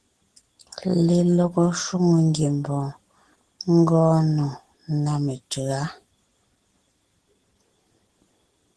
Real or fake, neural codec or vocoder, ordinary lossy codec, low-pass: real; none; Opus, 16 kbps; 10.8 kHz